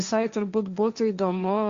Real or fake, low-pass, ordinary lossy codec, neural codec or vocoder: fake; 7.2 kHz; Opus, 64 kbps; codec, 16 kHz, 1.1 kbps, Voila-Tokenizer